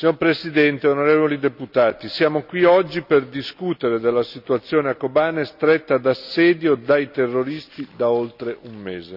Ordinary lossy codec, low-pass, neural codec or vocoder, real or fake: none; 5.4 kHz; none; real